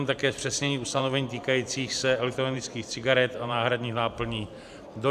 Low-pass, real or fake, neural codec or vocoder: 14.4 kHz; fake; vocoder, 44.1 kHz, 128 mel bands every 512 samples, BigVGAN v2